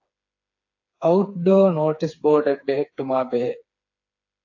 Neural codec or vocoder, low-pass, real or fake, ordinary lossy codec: codec, 16 kHz, 4 kbps, FreqCodec, smaller model; 7.2 kHz; fake; AAC, 48 kbps